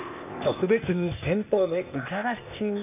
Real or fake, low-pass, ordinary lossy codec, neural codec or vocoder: fake; 3.6 kHz; none; codec, 16 kHz, 0.8 kbps, ZipCodec